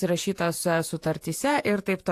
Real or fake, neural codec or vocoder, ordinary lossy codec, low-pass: fake; vocoder, 48 kHz, 128 mel bands, Vocos; AAC, 64 kbps; 14.4 kHz